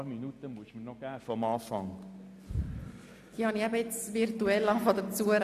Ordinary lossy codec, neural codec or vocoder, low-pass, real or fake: none; none; 14.4 kHz; real